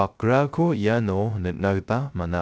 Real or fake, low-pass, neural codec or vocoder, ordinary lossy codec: fake; none; codec, 16 kHz, 0.3 kbps, FocalCodec; none